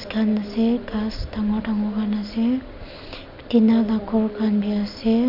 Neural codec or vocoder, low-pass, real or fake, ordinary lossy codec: none; 5.4 kHz; real; none